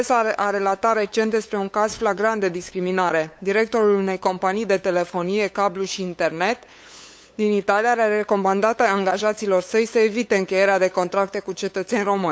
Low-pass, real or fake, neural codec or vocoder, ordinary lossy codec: none; fake; codec, 16 kHz, 8 kbps, FunCodec, trained on LibriTTS, 25 frames a second; none